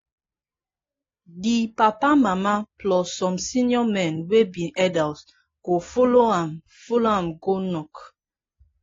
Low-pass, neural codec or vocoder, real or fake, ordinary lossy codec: 7.2 kHz; none; real; AAC, 32 kbps